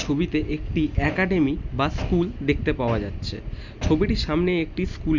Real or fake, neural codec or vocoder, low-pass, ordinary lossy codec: real; none; 7.2 kHz; none